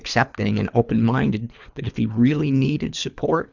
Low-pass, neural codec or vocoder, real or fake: 7.2 kHz; codec, 24 kHz, 3 kbps, HILCodec; fake